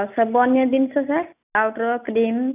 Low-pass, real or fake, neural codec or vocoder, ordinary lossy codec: 3.6 kHz; real; none; none